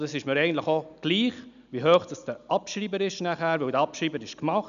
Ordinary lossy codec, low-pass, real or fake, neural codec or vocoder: none; 7.2 kHz; real; none